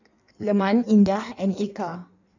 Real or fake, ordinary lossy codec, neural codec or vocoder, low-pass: fake; none; codec, 16 kHz in and 24 kHz out, 1.1 kbps, FireRedTTS-2 codec; 7.2 kHz